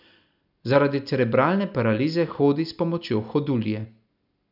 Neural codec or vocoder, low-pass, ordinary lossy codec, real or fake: none; 5.4 kHz; none; real